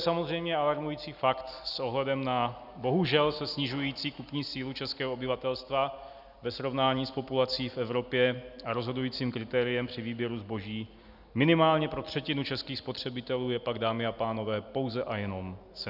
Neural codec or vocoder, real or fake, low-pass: none; real; 5.4 kHz